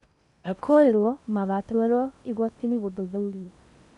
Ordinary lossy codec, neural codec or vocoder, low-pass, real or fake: none; codec, 16 kHz in and 24 kHz out, 0.8 kbps, FocalCodec, streaming, 65536 codes; 10.8 kHz; fake